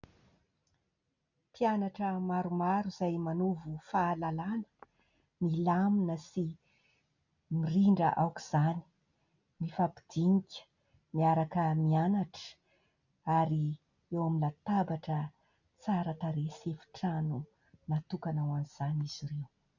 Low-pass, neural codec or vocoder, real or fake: 7.2 kHz; none; real